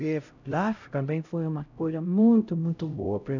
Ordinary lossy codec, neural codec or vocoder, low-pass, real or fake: none; codec, 16 kHz, 0.5 kbps, X-Codec, HuBERT features, trained on LibriSpeech; 7.2 kHz; fake